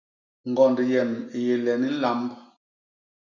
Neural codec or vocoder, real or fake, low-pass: none; real; 7.2 kHz